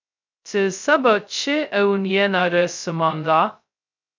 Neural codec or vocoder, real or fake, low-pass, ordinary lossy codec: codec, 16 kHz, 0.2 kbps, FocalCodec; fake; 7.2 kHz; MP3, 64 kbps